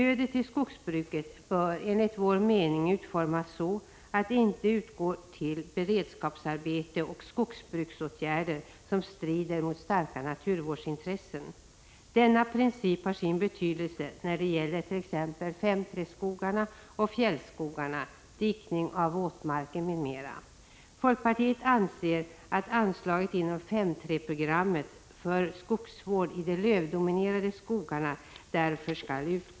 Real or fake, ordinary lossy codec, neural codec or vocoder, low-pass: real; none; none; none